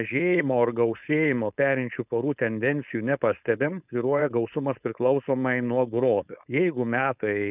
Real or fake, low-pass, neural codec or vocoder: fake; 3.6 kHz; codec, 16 kHz, 4.8 kbps, FACodec